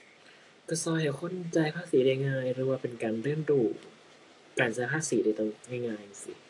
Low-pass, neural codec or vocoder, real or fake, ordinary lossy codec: 10.8 kHz; none; real; AAC, 48 kbps